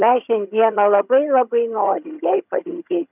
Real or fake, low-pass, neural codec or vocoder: fake; 3.6 kHz; vocoder, 22.05 kHz, 80 mel bands, HiFi-GAN